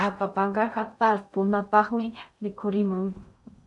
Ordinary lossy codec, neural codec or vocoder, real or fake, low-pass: MP3, 96 kbps; codec, 16 kHz in and 24 kHz out, 0.6 kbps, FocalCodec, streaming, 2048 codes; fake; 10.8 kHz